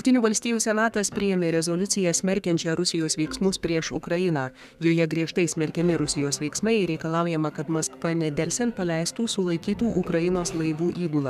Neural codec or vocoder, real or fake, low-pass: codec, 32 kHz, 1.9 kbps, SNAC; fake; 14.4 kHz